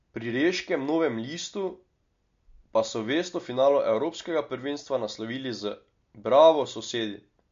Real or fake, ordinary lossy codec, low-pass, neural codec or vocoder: real; MP3, 48 kbps; 7.2 kHz; none